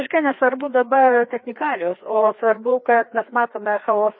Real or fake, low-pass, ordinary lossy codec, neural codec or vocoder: fake; 7.2 kHz; MP3, 24 kbps; codec, 16 kHz, 2 kbps, FreqCodec, larger model